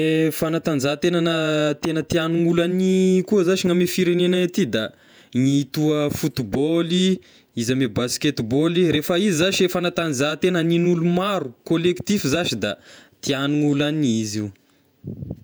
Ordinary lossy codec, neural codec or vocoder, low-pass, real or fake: none; vocoder, 48 kHz, 128 mel bands, Vocos; none; fake